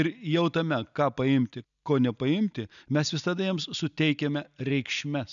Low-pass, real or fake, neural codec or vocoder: 7.2 kHz; real; none